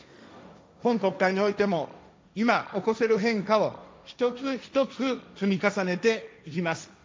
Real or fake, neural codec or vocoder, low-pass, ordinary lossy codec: fake; codec, 16 kHz, 1.1 kbps, Voila-Tokenizer; none; none